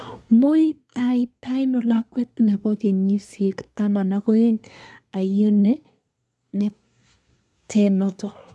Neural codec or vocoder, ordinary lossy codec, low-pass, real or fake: codec, 24 kHz, 1 kbps, SNAC; none; none; fake